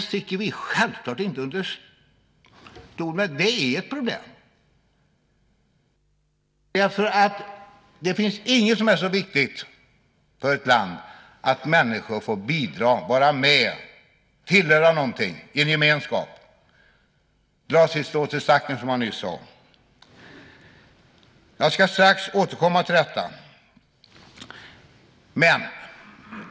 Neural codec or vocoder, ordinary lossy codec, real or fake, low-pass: none; none; real; none